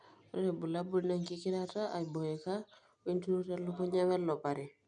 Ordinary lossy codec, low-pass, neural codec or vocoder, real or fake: none; 9.9 kHz; none; real